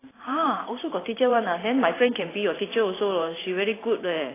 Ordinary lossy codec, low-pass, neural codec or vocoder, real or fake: AAC, 16 kbps; 3.6 kHz; vocoder, 44.1 kHz, 128 mel bands every 512 samples, BigVGAN v2; fake